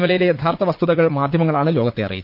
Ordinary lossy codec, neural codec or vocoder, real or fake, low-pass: Opus, 32 kbps; vocoder, 44.1 kHz, 80 mel bands, Vocos; fake; 5.4 kHz